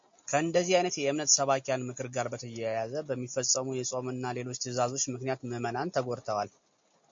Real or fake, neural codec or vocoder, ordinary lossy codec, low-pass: real; none; MP3, 48 kbps; 7.2 kHz